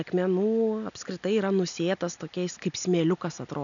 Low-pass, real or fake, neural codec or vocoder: 7.2 kHz; real; none